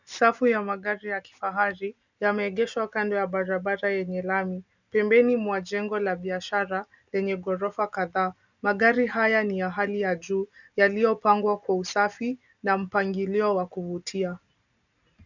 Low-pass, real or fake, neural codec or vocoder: 7.2 kHz; real; none